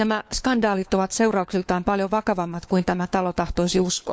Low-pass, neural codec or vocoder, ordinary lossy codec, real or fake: none; codec, 16 kHz, 4 kbps, FunCodec, trained on LibriTTS, 50 frames a second; none; fake